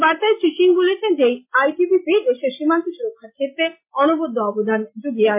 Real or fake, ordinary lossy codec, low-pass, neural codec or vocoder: real; MP3, 24 kbps; 3.6 kHz; none